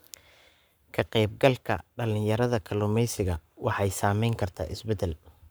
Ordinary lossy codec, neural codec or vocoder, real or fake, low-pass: none; codec, 44.1 kHz, 7.8 kbps, Pupu-Codec; fake; none